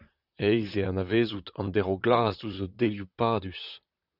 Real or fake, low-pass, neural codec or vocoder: fake; 5.4 kHz; vocoder, 44.1 kHz, 128 mel bands, Pupu-Vocoder